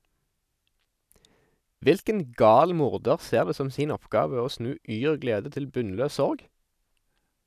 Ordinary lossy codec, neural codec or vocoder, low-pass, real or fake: none; none; 14.4 kHz; real